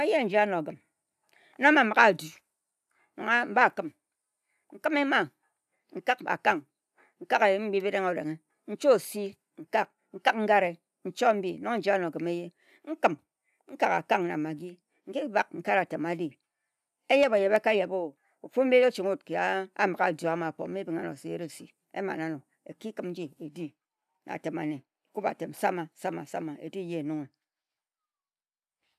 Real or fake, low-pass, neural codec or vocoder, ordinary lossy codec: real; 14.4 kHz; none; none